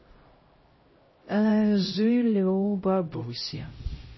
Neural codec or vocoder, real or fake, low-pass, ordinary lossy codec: codec, 16 kHz, 0.5 kbps, X-Codec, HuBERT features, trained on LibriSpeech; fake; 7.2 kHz; MP3, 24 kbps